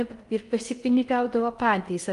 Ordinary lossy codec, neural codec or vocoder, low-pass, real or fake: Opus, 32 kbps; codec, 16 kHz in and 24 kHz out, 0.6 kbps, FocalCodec, streaming, 4096 codes; 10.8 kHz; fake